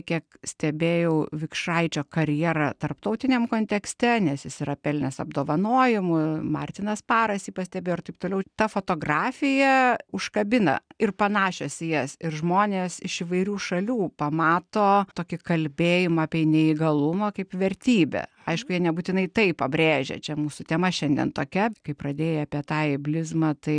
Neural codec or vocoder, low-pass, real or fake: none; 9.9 kHz; real